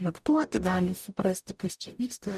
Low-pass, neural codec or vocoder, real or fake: 14.4 kHz; codec, 44.1 kHz, 0.9 kbps, DAC; fake